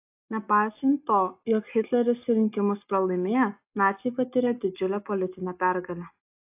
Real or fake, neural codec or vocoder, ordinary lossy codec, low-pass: real; none; AAC, 32 kbps; 3.6 kHz